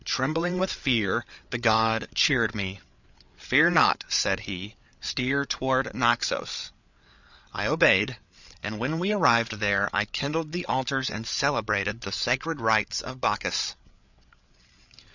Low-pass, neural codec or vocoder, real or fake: 7.2 kHz; codec, 16 kHz, 8 kbps, FreqCodec, larger model; fake